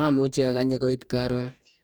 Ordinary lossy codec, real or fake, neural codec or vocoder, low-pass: none; fake; codec, 44.1 kHz, 2.6 kbps, DAC; 19.8 kHz